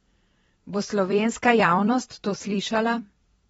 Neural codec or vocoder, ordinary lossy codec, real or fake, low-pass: vocoder, 44.1 kHz, 128 mel bands every 256 samples, BigVGAN v2; AAC, 24 kbps; fake; 19.8 kHz